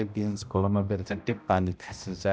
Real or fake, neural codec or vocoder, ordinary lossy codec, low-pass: fake; codec, 16 kHz, 0.5 kbps, X-Codec, HuBERT features, trained on balanced general audio; none; none